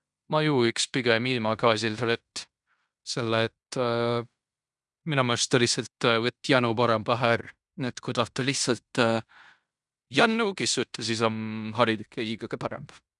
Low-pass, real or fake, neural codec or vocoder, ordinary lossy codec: 10.8 kHz; fake; codec, 16 kHz in and 24 kHz out, 0.9 kbps, LongCat-Audio-Codec, fine tuned four codebook decoder; none